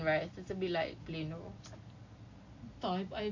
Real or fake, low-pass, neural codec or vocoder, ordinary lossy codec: real; 7.2 kHz; none; AAC, 48 kbps